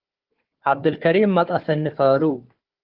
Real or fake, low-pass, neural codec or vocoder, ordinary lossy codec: fake; 5.4 kHz; codec, 16 kHz, 4 kbps, FunCodec, trained on Chinese and English, 50 frames a second; Opus, 16 kbps